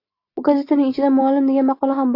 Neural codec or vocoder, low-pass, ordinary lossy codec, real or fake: none; 5.4 kHz; AAC, 32 kbps; real